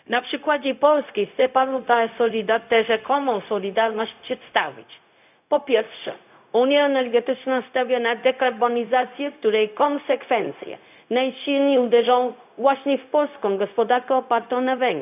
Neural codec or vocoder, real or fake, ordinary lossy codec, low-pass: codec, 16 kHz, 0.4 kbps, LongCat-Audio-Codec; fake; none; 3.6 kHz